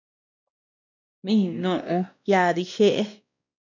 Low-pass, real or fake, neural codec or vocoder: 7.2 kHz; fake; codec, 16 kHz, 1 kbps, X-Codec, WavLM features, trained on Multilingual LibriSpeech